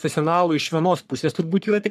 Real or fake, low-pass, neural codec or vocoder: fake; 14.4 kHz; codec, 44.1 kHz, 3.4 kbps, Pupu-Codec